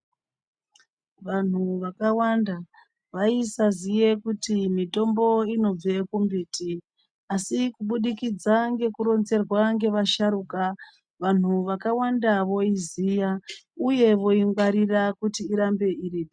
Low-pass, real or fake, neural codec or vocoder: 9.9 kHz; real; none